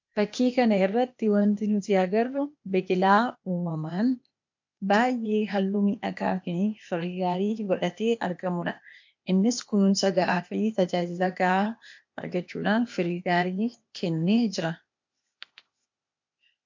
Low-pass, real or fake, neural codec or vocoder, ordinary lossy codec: 7.2 kHz; fake; codec, 16 kHz, 0.8 kbps, ZipCodec; MP3, 48 kbps